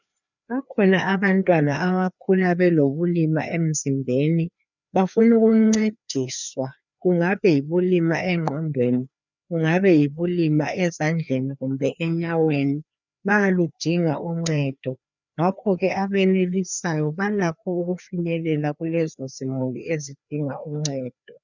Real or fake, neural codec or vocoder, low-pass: fake; codec, 16 kHz, 2 kbps, FreqCodec, larger model; 7.2 kHz